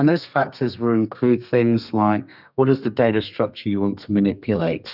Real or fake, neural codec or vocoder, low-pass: fake; codec, 32 kHz, 1.9 kbps, SNAC; 5.4 kHz